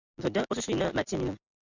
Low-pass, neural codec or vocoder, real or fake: 7.2 kHz; none; real